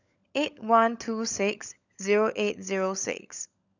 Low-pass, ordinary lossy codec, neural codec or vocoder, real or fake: 7.2 kHz; none; codec, 16 kHz, 16 kbps, FunCodec, trained on LibriTTS, 50 frames a second; fake